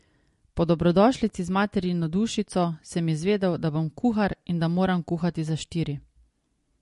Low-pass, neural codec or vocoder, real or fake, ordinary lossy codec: 14.4 kHz; none; real; MP3, 48 kbps